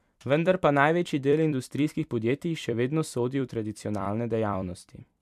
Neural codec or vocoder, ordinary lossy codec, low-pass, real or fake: vocoder, 44.1 kHz, 128 mel bands every 256 samples, BigVGAN v2; MP3, 96 kbps; 14.4 kHz; fake